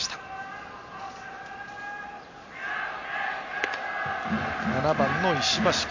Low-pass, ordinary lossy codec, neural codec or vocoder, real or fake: 7.2 kHz; MP3, 48 kbps; none; real